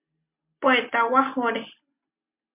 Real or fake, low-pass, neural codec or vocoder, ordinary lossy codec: real; 3.6 kHz; none; MP3, 32 kbps